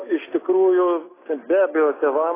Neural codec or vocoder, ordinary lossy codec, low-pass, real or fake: none; AAC, 16 kbps; 3.6 kHz; real